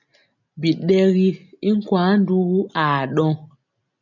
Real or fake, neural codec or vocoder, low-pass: real; none; 7.2 kHz